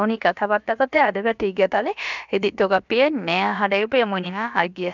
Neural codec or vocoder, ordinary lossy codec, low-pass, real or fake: codec, 16 kHz, about 1 kbps, DyCAST, with the encoder's durations; none; 7.2 kHz; fake